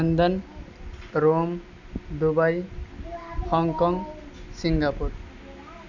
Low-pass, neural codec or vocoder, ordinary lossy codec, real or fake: 7.2 kHz; none; none; real